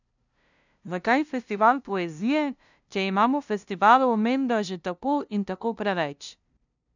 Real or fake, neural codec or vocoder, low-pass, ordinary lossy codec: fake; codec, 16 kHz, 0.5 kbps, FunCodec, trained on LibriTTS, 25 frames a second; 7.2 kHz; none